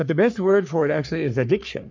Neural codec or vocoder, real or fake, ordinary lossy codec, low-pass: codec, 44.1 kHz, 3.4 kbps, Pupu-Codec; fake; MP3, 64 kbps; 7.2 kHz